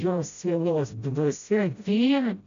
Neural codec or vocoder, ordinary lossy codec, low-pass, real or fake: codec, 16 kHz, 0.5 kbps, FreqCodec, smaller model; MP3, 64 kbps; 7.2 kHz; fake